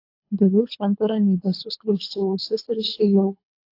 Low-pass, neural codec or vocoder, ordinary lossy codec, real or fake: 5.4 kHz; codec, 24 kHz, 3 kbps, HILCodec; AAC, 32 kbps; fake